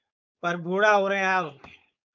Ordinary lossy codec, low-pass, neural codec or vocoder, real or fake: MP3, 64 kbps; 7.2 kHz; codec, 16 kHz, 4.8 kbps, FACodec; fake